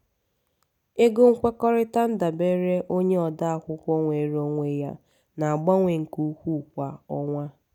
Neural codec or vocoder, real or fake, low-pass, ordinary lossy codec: none; real; 19.8 kHz; none